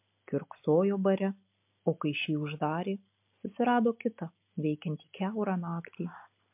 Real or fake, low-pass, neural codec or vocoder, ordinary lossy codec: real; 3.6 kHz; none; MP3, 32 kbps